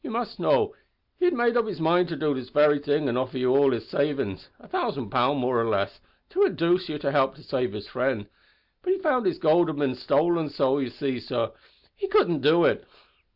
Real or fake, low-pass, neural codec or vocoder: real; 5.4 kHz; none